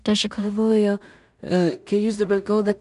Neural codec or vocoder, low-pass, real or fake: codec, 16 kHz in and 24 kHz out, 0.4 kbps, LongCat-Audio-Codec, two codebook decoder; 10.8 kHz; fake